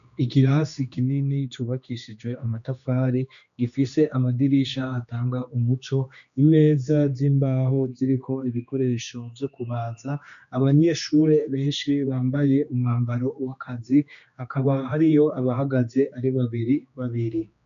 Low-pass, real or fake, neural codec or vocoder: 7.2 kHz; fake; codec, 16 kHz, 2 kbps, X-Codec, HuBERT features, trained on general audio